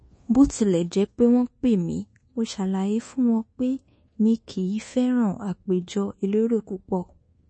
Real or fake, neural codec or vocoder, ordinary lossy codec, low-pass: fake; codec, 24 kHz, 1.2 kbps, DualCodec; MP3, 32 kbps; 9.9 kHz